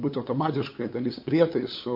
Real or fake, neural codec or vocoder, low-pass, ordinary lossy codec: fake; codec, 16 kHz, 8 kbps, FunCodec, trained on LibriTTS, 25 frames a second; 5.4 kHz; MP3, 32 kbps